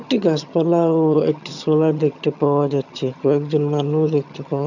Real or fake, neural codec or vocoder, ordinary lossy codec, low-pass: fake; vocoder, 22.05 kHz, 80 mel bands, HiFi-GAN; none; 7.2 kHz